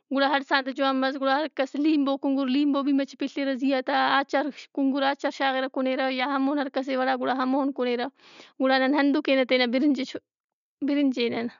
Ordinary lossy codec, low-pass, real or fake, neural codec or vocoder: MP3, 96 kbps; 7.2 kHz; real; none